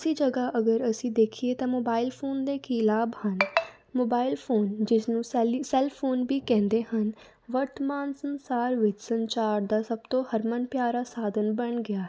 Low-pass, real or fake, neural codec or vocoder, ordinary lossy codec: none; real; none; none